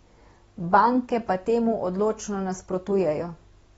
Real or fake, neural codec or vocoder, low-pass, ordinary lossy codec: real; none; 19.8 kHz; AAC, 24 kbps